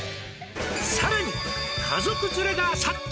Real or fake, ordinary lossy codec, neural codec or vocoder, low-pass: real; none; none; none